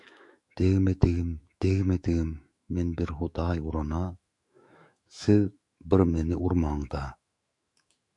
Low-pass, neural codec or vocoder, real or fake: 10.8 kHz; autoencoder, 48 kHz, 128 numbers a frame, DAC-VAE, trained on Japanese speech; fake